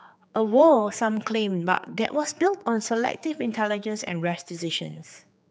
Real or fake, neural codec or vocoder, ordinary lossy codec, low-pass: fake; codec, 16 kHz, 4 kbps, X-Codec, HuBERT features, trained on general audio; none; none